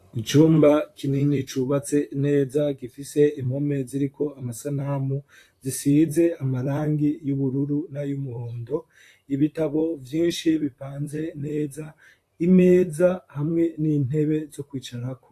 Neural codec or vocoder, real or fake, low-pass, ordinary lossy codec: vocoder, 44.1 kHz, 128 mel bands, Pupu-Vocoder; fake; 14.4 kHz; AAC, 64 kbps